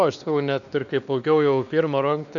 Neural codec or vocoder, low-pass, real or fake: codec, 16 kHz, 2 kbps, X-Codec, WavLM features, trained on Multilingual LibriSpeech; 7.2 kHz; fake